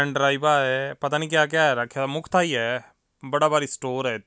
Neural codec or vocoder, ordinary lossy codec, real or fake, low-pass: none; none; real; none